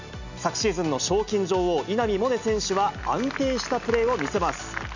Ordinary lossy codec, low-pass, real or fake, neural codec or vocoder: none; 7.2 kHz; real; none